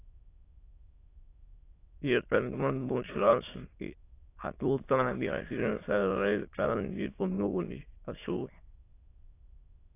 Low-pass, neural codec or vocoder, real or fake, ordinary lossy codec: 3.6 kHz; autoencoder, 22.05 kHz, a latent of 192 numbers a frame, VITS, trained on many speakers; fake; AAC, 24 kbps